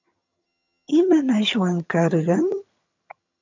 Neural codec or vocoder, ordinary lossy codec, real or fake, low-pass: vocoder, 22.05 kHz, 80 mel bands, HiFi-GAN; MP3, 64 kbps; fake; 7.2 kHz